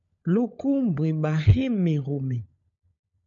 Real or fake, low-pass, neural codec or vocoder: fake; 7.2 kHz; codec, 16 kHz, 16 kbps, FunCodec, trained on LibriTTS, 50 frames a second